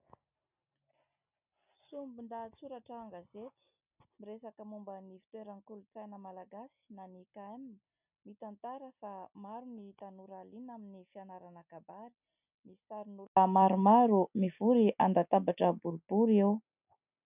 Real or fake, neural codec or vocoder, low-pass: real; none; 3.6 kHz